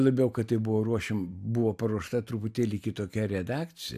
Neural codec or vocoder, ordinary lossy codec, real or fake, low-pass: none; AAC, 96 kbps; real; 14.4 kHz